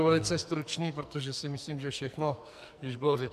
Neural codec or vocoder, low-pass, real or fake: codec, 44.1 kHz, 2.6 kbps, SNAC; 14.4 kHz; fake